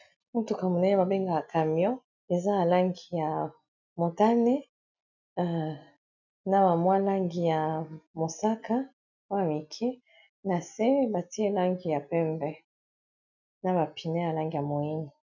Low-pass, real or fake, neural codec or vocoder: 7.2 kHz; real; none